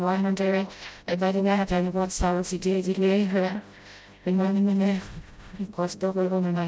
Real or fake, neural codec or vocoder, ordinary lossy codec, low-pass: fake; codec, 16 kHz, 0.5 kbps, FreqCodec, smaller model; none; none